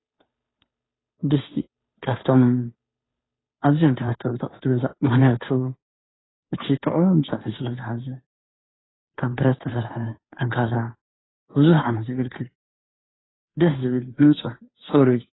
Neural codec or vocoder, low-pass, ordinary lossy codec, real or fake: codec, 16 kHz, 2 kbps, FunCodec, trained on Chinese and English, 25 frames a second; 7.2 kHz; AAC, 16 kbps; fake